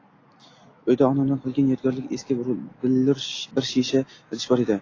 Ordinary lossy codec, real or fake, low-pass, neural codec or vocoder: AAC, 32 kbps; real; 7.2 kHz; none